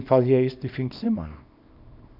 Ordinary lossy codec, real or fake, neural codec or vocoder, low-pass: none; fake; codec, 24 kHz, 0.9 kbps, WavTokenizer, small release; 5.4 kHz